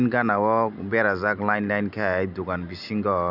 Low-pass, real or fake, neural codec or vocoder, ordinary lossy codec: 5.4 kHz; real; none; none